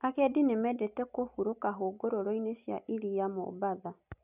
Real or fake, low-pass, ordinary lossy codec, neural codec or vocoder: real; 3.6 kHz; none; none